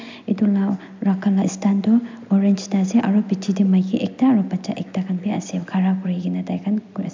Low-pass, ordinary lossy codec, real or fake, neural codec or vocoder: 7.2 kHz; MP3, 64 kbps; real; none